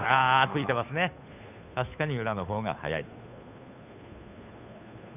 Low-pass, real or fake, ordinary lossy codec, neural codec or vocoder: 3.6 kHz; fake; none; codec, 16 kHz, 2 kbps, FunCodec, trained on Chinese and English, 25 frames a second